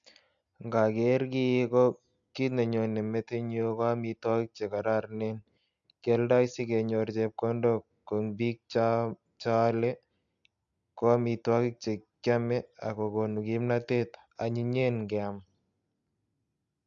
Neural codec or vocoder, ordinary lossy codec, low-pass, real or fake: none; AAC, 64 kbps; 7.2 kHz; real